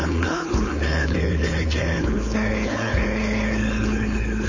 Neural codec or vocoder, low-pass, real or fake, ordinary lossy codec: codec, 16 kHz, 4.8 kbps, FACodec; 7.2 kHz; fake; MP3, 32 kbps